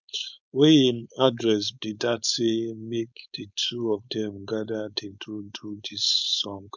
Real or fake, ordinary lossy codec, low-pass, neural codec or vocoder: fake; none; 7.2 kHz; codec, 16 kHz, 4.8 kbps, FACodec